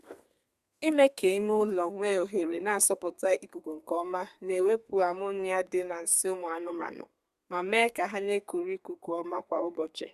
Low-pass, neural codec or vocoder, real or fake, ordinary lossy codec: 14.4 kHz; codec, 32 kHz, 1.9 kbps, SNAC; fake; Opus, 64 kbps